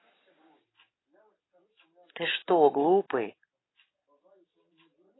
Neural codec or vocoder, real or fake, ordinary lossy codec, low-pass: none; real; AAC, 16 kbps; 7.2 kHz